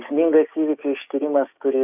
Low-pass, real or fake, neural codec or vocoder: 3.6 kHz; fake; codec, 16 kHz, 6 kbps, DAC